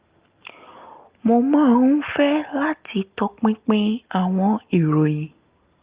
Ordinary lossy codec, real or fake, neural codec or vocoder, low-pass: Opus, 32 kbps; real; none; 3.6 kHz